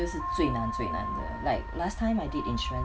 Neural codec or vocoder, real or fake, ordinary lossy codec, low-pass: none; real; none; none